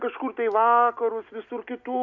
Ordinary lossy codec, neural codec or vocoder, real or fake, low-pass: MP3, 64 kbps; none; real; 7.2 kHz